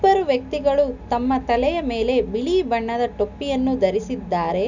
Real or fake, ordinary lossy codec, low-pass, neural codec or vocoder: real; none; 7.2 kHz; none